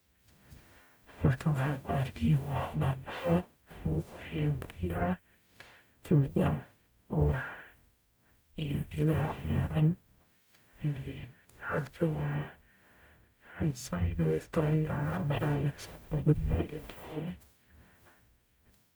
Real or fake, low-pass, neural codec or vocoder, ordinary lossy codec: fake; none; codec, 44.1 kHz, 0.9 kbps, DAC; none